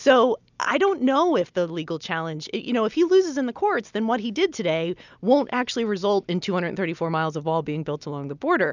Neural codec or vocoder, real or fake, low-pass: none; real; 7.2 kHz